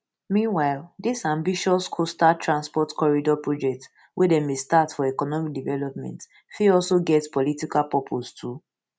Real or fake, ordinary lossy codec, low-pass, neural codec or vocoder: real; none; none; none